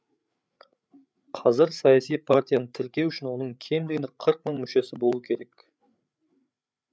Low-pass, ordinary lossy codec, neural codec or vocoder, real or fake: none; none; codec, 16 kHz, 8 kbps, FreqCodec, larger model; fake